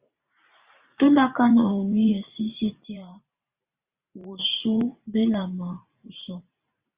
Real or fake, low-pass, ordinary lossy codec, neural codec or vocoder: fake; 3.6 kHz; Opus, 64 kbps; vocoder, 44.1 kHz, 128 mel bands every 512 samples, BigVGAN v2